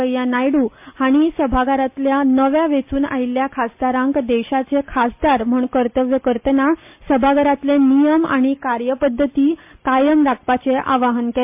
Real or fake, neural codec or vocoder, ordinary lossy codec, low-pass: real; none; MP3, 32 kbps; 3.6 kHz